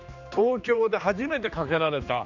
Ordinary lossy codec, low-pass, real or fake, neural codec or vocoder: none; 7.2 kHz; fake; codec, 16 kHz, 2 kbps, X-Codec, HuBERT features, trained on general audio